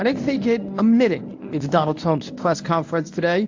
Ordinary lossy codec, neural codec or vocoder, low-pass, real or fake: AAC, 48 kbps; codec, 24 kHz, 0.9 kbps, WavTokenizer, medium speech release version 1; 7.2 kHz; fake